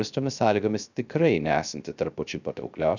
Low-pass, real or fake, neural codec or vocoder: 7.2 kHz; fake; codec, 16 kHz, 0.3 kbps, FocalCodec